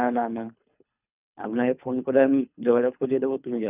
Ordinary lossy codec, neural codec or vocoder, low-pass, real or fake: none; codec, 24 kHz, 3 kbps, HILCodec; 3.6 kHz; fake